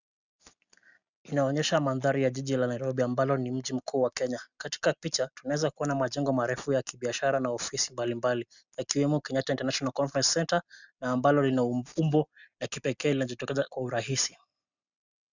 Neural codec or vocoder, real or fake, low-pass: none; real; 7.2 kHz